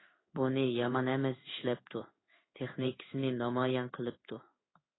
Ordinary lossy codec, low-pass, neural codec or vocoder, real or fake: AAC, 16 kbps; 7.2 kHz; codec, 16 kHz in and 24 kHz out, 1 kbps, XY-Tokenizer; fake